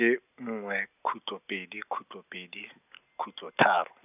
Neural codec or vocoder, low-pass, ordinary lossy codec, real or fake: none; 3.6 kHz; none; real